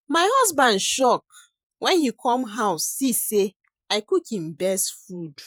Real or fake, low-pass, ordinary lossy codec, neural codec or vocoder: fake; none; none; vocoder, 48 kHz, 128 mel bands, Vocos